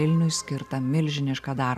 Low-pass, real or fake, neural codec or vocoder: 14.4 kHz; real; none